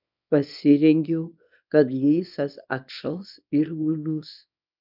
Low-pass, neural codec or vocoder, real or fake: 5.4 kHz; codec, 24 kHz, 0.9 kbps, WavTokenizer, small release; fake